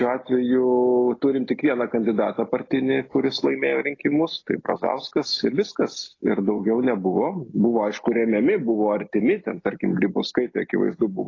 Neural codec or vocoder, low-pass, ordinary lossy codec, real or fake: none; 7.2 kHz; AAC, 32 kbps; real